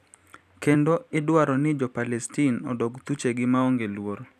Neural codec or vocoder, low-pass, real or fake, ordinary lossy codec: vocoder, 44.1 kHz, 128 mel bands every 256 samples, BigVGAN v2; 14.4 kHz; fake; none